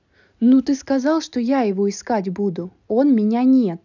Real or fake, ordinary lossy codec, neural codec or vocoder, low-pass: real; none; none; 7.2 kHz